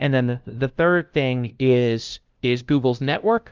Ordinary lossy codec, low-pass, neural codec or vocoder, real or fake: Opus, 24 kbps; 7.2 kHz; codec, 16 kHz, 0.5 kbps, FunCodec, trained on LibriTTS, 25 frames a second; fake